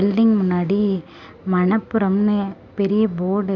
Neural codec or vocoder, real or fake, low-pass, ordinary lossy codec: none; real; 7.2 kHz; none